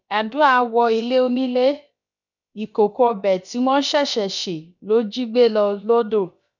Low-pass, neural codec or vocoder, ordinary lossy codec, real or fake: 7.2 kHz; codec, 16 kHz, about 1 kbps, DyCAST, with the encoder's durations; none; fake